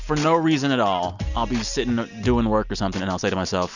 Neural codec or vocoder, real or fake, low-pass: none; real; 7.2 kHz